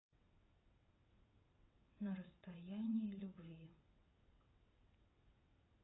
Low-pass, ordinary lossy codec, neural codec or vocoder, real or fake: 7.2 kHz; AAC, 16 kbps; vocoder, 22.05 kHz, 80 mel bands, WaveNeXt; fake